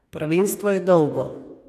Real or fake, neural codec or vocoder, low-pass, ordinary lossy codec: fake; codec, 44.1 kHz, 2.6 kbps, DAC; 14.4 kHz; none